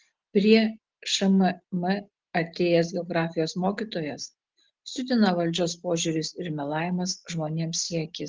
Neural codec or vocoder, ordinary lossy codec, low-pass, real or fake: none; Opus, 16 kbps; 7.2 kHz; real